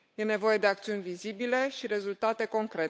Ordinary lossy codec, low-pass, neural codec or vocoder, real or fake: none; none; codec, 16 kHz, 8 kbps, FunCodec, trained on Chinese and English, 25 frames a second; fake